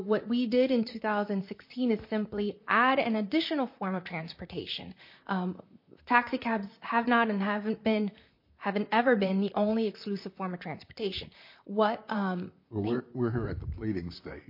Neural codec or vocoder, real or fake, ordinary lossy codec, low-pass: vocoder, 44.1 kHz, 128 mel bands, Pupu-Vocoder; fake; MP3, 32 kbps; 5.4 kHz